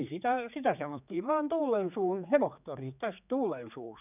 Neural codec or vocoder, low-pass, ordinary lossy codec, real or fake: codec, 16 kHz, 4 kbps, X-Codec, HuBERT features, trained on general audio; 3.6 kHz; none; fake